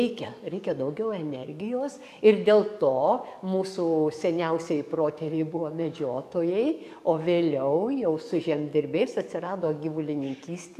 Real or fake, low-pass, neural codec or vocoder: fake; 14.4 kHz; codec, 44.1 kHz, 7.8 kbps, DAC